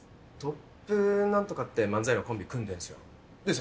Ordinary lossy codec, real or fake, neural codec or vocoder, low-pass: none; real; none; none